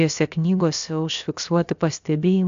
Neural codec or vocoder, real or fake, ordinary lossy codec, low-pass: codec, 16 kHz, about 1 kbps, DyCAST, with the encoder's durations; fake; AAC, 64 kbps; 7.2 kHz